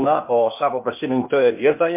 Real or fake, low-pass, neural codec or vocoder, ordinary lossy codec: fake; 3.6 kHz; codec, 16 kHz, 0.8 kbps, ZipCodec; MP3, 24 kbps